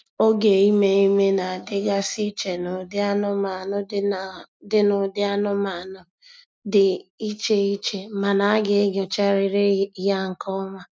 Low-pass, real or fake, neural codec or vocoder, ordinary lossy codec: none; real; none; none